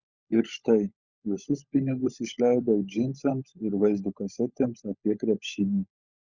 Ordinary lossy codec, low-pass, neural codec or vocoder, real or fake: Opus, 64 kbps; 7.2 kHz; codec, 16 kHz, 16 kbps, FunCodec, trained on LibriTTS, 50 frames a second; fake